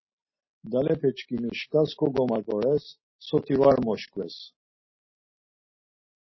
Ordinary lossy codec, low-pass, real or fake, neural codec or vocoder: MP3, 24 kbps; 7.2 kHz; real; none